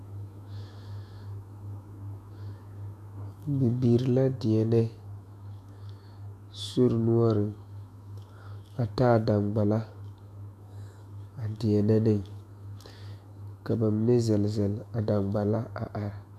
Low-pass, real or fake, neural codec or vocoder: 14.4 kHz; fake; autoencoder, 48 kHz, 128 numbers a frame, DAC-VAE, trained on Japanese speech